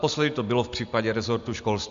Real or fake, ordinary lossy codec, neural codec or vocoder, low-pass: real; MP3, 64 kbps; none; 7.2 kHz